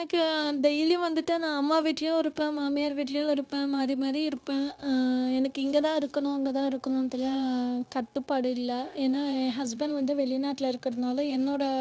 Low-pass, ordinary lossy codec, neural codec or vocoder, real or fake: none; none; codec, 16 kHz, 0.9 kbps, LongCat-Audio-Codec; fake